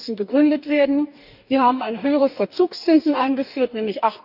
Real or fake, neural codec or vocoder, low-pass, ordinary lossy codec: fake; codec, 44.1 kHz, 2.6 kbps, DAC; 5.4 kHz; none